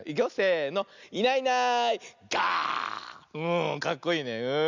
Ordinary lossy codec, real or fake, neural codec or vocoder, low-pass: none; real; none; 7.2 kHz